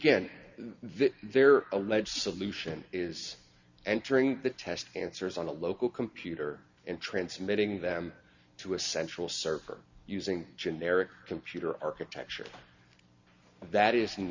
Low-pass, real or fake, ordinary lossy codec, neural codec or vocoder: 7.2 kHz; real; Opus, 64 kbps; none